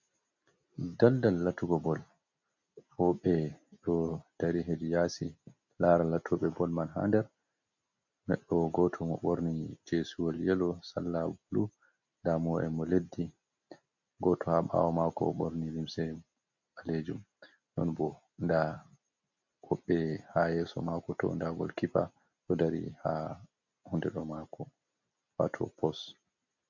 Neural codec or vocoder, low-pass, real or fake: none; 7.2 kHz; real